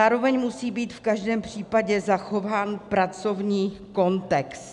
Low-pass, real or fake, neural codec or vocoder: 10.8 kHz; real; none